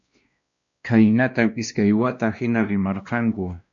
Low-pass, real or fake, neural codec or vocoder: 7.2 kHz; fake; codec, 16 kHz, 1 kbps, X-Codec, WavLM features, trained on Multilingual LibriSpeech